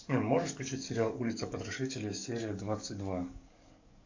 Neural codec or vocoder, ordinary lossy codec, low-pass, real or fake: codec, 44.1 kHz, 7.8 kbps, DAC; AAC, 48 kbps; 7.2 kHz; fake